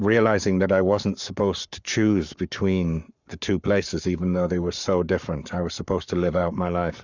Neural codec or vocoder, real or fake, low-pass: codec, 44.1 kHz, 7.8 kbps, Pupu-Codec; fake; 7.2 kHz